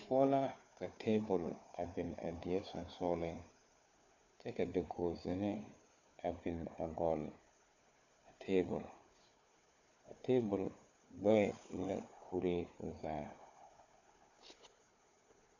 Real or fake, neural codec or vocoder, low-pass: fake; codec, 16 kHz, 4 kbps, FunCodec, trained on Chinese and English, 50 frames a second; 7.2 kHz